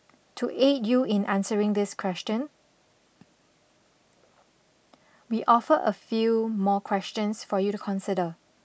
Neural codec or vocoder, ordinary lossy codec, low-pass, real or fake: none; none; none; real